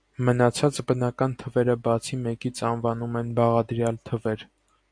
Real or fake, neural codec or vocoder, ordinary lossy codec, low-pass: real; none; AAC, 64 kbps; 9.9 kHz